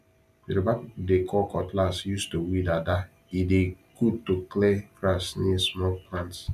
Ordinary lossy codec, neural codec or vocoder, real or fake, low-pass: none; none; real; 14.4 kHz